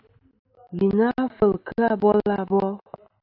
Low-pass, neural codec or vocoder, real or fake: 5.4 kHz; none; real